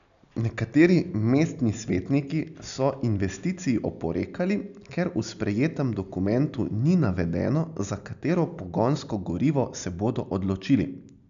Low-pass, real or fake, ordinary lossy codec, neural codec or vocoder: 7.2 kHz; real; MP3, 96 kbps; none